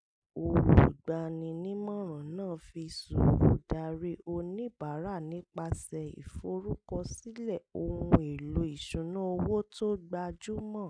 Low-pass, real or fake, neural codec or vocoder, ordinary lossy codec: 9.9 kHz; real; none; none